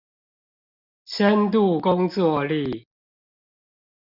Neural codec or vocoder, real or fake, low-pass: none; real; 5.4 kHz